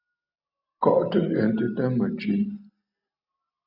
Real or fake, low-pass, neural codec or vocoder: real; 5.4 kHz; none